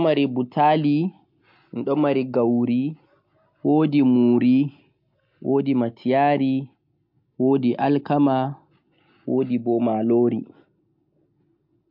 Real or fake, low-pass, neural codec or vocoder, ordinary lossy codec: real; 5.4 kHz; none; MP3, 48 kbps